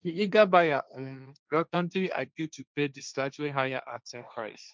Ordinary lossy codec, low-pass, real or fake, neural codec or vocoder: none; none; fake; codec, 16 kHz, 1.1 kbps, Voila-Tokenizer